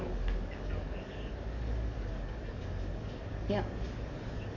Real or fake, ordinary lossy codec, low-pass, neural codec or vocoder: fake; none; 7.2 kHz; codec, 44.1 kHz, 7.8 kbps, DAC